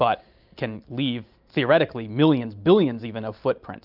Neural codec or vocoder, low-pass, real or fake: none; 5.4 kHz; real